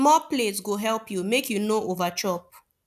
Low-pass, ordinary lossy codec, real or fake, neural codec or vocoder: 14.4 kHz; none; real; none